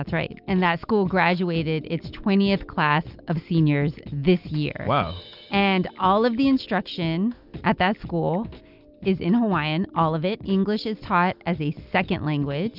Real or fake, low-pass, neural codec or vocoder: real; 5.4 kHz; none